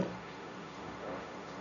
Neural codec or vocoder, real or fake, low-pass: codec, 16 kHz, 1.1 kbps, Voila-Tokenizer; fake; 7.2 kHz